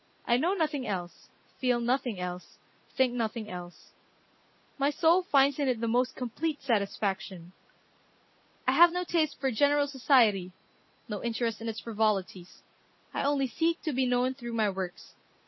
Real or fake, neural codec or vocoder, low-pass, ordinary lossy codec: real; none; 7.2 kHz; MP3, 24 kbps